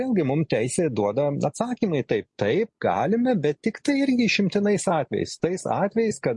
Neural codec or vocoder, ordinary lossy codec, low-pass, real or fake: none; MP3, 48 kbps; 10.8 kHz; real